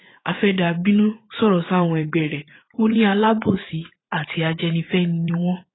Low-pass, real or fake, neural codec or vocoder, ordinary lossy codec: 7.2 kHz; fake; vocoder, 44.1 kHz, 80 mel bands, Vocos; AAC, 16 kbps